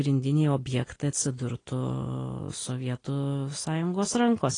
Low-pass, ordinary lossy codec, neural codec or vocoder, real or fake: 9.9 kHz; AAC, 32 kbps; none; real